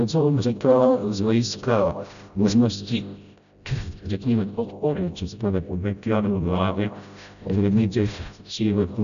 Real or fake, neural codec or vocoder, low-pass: fake; codec, 16 kHz, 0.5 kbps, FreqCodec, smaller model; 7.2 kHz